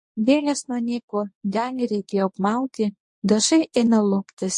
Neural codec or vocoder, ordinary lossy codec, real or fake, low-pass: codec, 24 kHz, 0.9 kbps, WavTokenizer, medium speech release version 1; MP3, 48 kbps; fake; 10.8 kHz